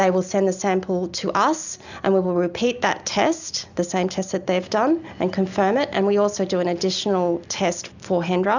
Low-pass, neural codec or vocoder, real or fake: 7.2 kHz; none; real